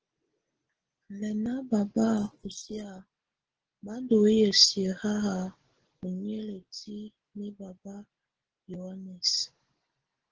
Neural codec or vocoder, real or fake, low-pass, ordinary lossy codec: none; real; 7.2 kHz; Opus, 16 kbps